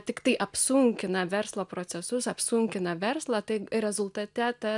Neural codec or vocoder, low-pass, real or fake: none; 10.8 kHz; real